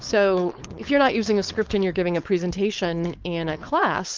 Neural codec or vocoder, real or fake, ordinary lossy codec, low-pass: codec, 16 kHz, 4.8 kbps, FACodec; fake; Opus, 24 kbps; 7.2 kHz